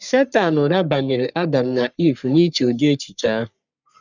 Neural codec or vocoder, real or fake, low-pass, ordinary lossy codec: codec, 44.1 kHz, 3.4 kbps, Pupu-Codec; fake; 7.2 kHz; none